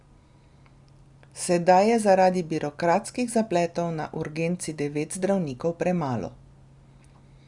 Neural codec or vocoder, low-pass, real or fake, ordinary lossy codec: none; 10.8 kHz; real; Opus, 64 kbps